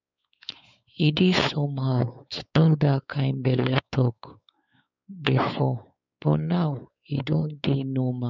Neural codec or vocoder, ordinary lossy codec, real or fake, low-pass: codec, 16 kHz, 4 kbps, X-Codec, WavLM features, trained on Multilingual LibriSpeech; none; fake; 7.2 kHz